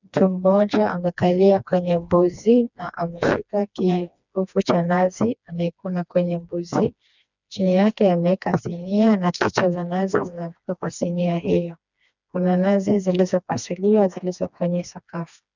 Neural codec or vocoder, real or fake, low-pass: codec, 16 kHz, 2 kbps, FreqCodec, smaller model; fake; 7.2 kHz